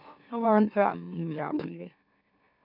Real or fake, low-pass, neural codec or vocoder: fake; 5.4 kHz; autoencoder, 44.1 kHz, a latent of 192 numbers a frame, MeloTTS